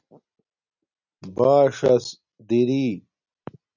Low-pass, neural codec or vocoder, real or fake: 7.2 kHz; none; real